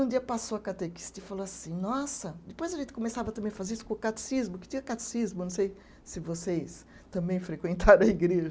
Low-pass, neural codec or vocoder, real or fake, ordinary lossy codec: none; none; real; none